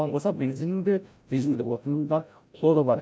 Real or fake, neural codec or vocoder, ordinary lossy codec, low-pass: fake; codec, 16 kHz, 0.5 kbps, FreqCodec, larger model; none; none